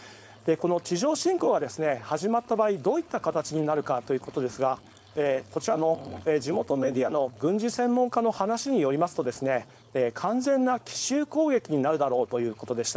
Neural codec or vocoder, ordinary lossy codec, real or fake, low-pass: codec, 16 kHz, 4.8 kbps, FACodec; none; fake; none